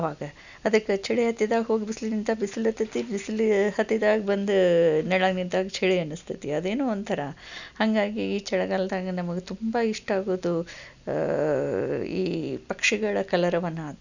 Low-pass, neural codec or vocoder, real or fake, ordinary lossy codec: 7.2 kHz; none; real; none